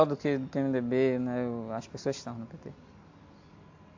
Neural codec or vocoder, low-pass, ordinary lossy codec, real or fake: none; 7.2 kHz; AAC, 48 kbps; real